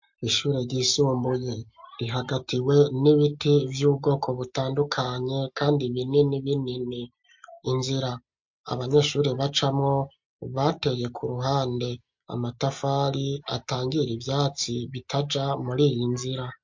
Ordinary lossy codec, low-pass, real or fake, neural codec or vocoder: MP3, 48 kbps; 7.2 kHz; real; none